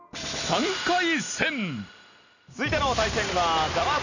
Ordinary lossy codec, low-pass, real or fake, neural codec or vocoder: none; 7.2 kHz; real; none